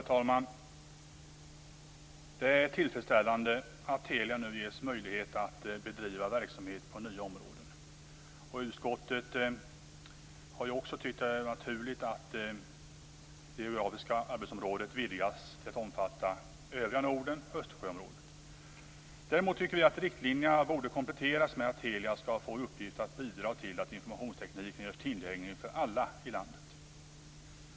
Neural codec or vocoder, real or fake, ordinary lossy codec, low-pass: none; real; none; none